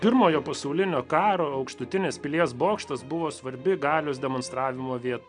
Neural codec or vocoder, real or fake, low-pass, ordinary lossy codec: none; real; 9.9 kHz; MP3, 96 kbps